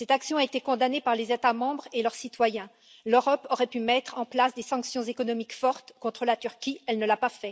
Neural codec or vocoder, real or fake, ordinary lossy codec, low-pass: none; real; none; none